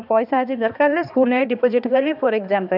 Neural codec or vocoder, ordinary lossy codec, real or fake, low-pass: codec, 16 kHz, 2 kbps, X-Codec, HuBERT features, trained on balanced general audio; none; fake; 5.4 kHz